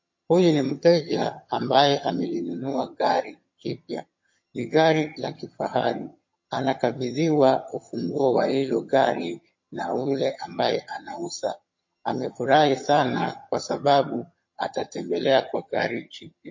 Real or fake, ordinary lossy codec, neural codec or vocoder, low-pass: fake; MP3, 32 kbps; vocoder, 22.05 kHz, 80 mel bands, HiFi-GAN; 7.2 kHz